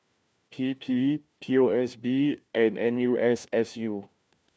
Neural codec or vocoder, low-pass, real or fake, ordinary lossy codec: codec, 16 kHz, 1 kbps, FunCodec, trained on LibriTTS, 50 frames a second; none; fake; none